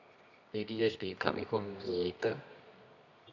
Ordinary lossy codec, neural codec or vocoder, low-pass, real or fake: none; codec, 24 kHz, 0.9 kbps, WavTokenizer, medium music audio release; 7.2 kHz; fake